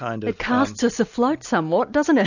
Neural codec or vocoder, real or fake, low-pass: none; real; 7.2 kHz